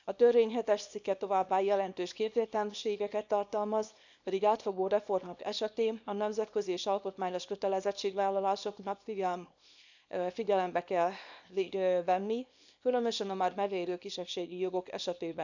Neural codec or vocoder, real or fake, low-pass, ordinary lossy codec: codec, 24 kHz, 0.9 kbps, WavTokenizer, small release; fake; 7.2 kHz; none